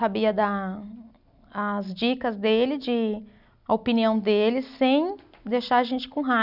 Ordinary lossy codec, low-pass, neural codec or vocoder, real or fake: none; 5.4 kHz; none; real